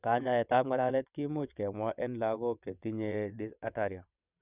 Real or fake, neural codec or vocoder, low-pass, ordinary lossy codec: fake; vocoder, 22.05 kHz, 80 mel bands, WaveNeXt; 3.6 kHz; none